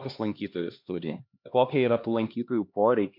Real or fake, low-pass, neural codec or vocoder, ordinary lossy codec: fake; 5.4 kHz; codec, 16 kHz, 2 kbps, X-Codec, HuBERT features, trained on LibriSpeech; MP3, 48 kbps